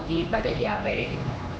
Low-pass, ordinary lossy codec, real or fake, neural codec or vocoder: none; none; fake; codec, 16 kHz, 2 kbps, X-Codec, HuBERT features, trained on LibriSpeech